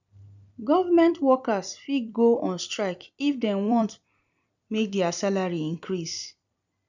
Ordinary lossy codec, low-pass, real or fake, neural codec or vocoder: none; 7.2 kHz; fake; vocoder, 44.1 kHz, 128 mel bands every 256 samples, BigVGAN v2